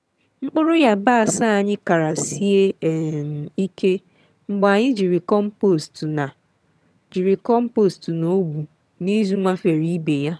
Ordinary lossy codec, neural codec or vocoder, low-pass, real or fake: none; vocoder, 22.05 kHz, 80 mel bands, HiFi-GAN; none; fake